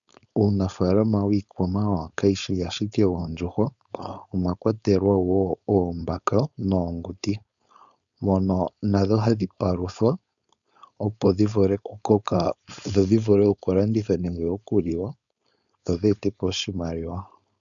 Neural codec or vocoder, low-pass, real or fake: codec, 16 kHz, 4.8 kbps, FACodec; 7.2 kHz; fake